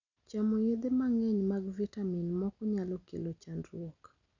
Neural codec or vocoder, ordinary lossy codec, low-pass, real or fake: none; none; 7.2 kHz; real